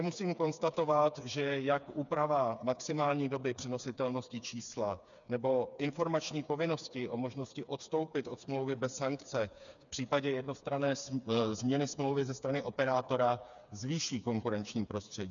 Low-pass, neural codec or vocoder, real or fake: 7.2 kHz; codec, 16 kHz, 4 kbps, FreqCodec, smaller model; fake